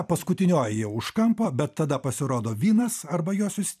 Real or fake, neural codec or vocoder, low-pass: fake; vocoder, 44.1 kHz, 128 mel bands every 256 samples, BigVGAN v2; 14.4 kHz